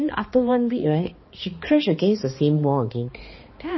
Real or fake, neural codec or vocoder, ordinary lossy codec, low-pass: fake; codec, 16 kHz, 2 kbps, X-Codec, HuBERT features, trained on balanced general audio; MP3, 24 kbps; 7.2 kHz